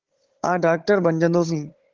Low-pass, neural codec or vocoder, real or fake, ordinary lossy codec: 7.2 kHz; codec, 16 kHz, 16 kbps, FunCodec, trained on Chinese and English, 50 frames a second; fake; Opus, 16 kbps